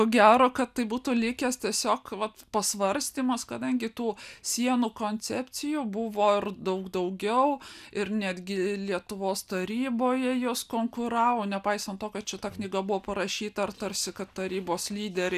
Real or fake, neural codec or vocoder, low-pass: real; none; 14.4 kHz